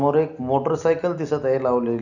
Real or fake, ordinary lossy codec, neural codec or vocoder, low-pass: real; none; none; 7.2 kHz